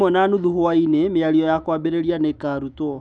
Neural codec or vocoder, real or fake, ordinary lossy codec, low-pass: autoencoder, 48 kHz, 128 numbers a frame, DAC-VAE, trained on Japanese speech; fake; none; 9.9 kHz